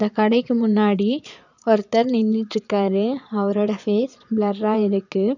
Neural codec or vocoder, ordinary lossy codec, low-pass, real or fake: vocoder, 22.05 kHz, 80 mel bands, Vocos; none; 7.2 kHz; fake